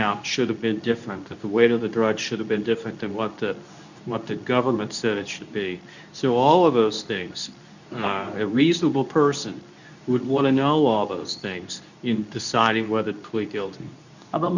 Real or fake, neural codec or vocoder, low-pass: fake; codec, 24 kHz, 0.9 kbps, WavTokenizer, medium speech release version 2; 7.2 kHz